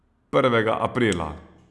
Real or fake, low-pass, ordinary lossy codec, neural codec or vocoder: real; none; none; none